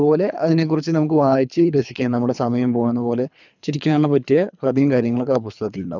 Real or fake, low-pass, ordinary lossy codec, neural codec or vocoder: fake; 7.2 kHz; none; codec, 24 kHz, 3 kbps, HILCodec